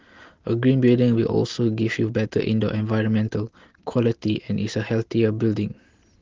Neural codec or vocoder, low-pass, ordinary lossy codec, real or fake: none; 7.2 kHz; Opus, 16 kbps; real